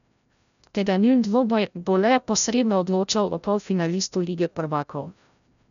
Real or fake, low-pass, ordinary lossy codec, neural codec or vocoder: fake; 7.2 kHz; none; codec, 16 kHz, 0.5 kbps, FreqCodec, larger model